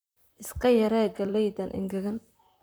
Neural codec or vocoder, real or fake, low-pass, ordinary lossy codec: vocoder, 44.1 kHz, 128 mel bands, Pupu-Vocoder; fake; none; none